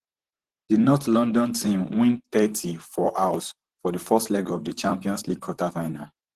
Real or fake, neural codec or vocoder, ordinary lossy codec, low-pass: fake; vocoder, 44.1 kHz, 128 mel bands, Pupu-Vocoder; Opus, 16 kbps; 14.4 kHz